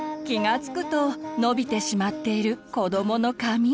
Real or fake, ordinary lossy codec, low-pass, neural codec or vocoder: real; none; none; none